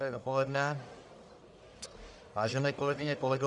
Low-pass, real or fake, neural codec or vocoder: 10.8 kHz; fake; codec, 44.1 kHz, 1.7 kbps, Pupu-Codec